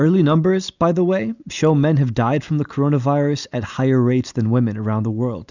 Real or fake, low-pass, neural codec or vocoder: real; 7.2 kHz; none